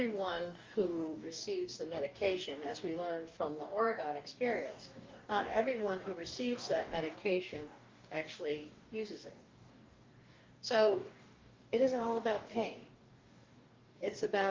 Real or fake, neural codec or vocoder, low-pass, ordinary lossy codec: fake; codec, 44.1 kHz, 2.6 kbps, DAC; 7.2 kHz; Opus, 32 kbps